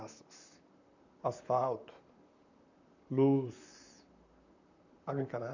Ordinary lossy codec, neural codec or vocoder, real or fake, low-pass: none; vocoder, 44.1 kHz, 128 mel bands, Pupu-Vocoder; fake; 7.2 kHz